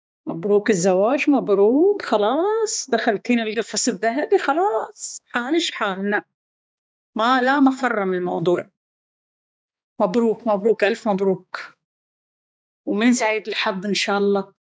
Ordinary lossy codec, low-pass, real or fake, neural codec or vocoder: none; none; fake; codec, 16 kHz, 2 kbps, X-Codec, HuBERT features, trained on balanced general audio